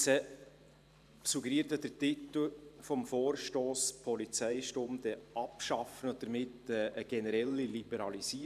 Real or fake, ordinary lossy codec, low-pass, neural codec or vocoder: fake; none; 14.4 kHz; vocoder, 44.1 kHz, 128 mel bands every 512 samples, BigVGAN v2